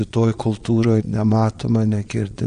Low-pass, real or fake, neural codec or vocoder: 9.9 kHz; fake; vocoder, 22.05 kHz, 80 mel bands, Vocos